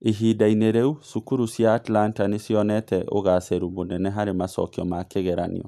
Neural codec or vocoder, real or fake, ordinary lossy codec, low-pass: none; real; none; 14.4 kHz